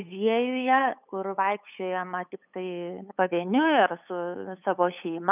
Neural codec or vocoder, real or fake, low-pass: codec, 16 kHz, 8 kbps, FunCodec, trained on LibriTTS, 25 frames a second; fake; 3.6 kHz